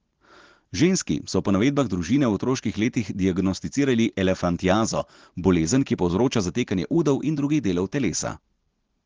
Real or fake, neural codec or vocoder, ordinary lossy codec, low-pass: real; none; Opus, 16 kbps; 7.2 kHz